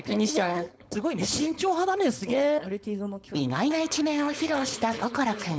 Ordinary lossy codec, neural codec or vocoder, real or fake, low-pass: none; codec, 16 kHz, 4.8 kbps, FACodec; fake; none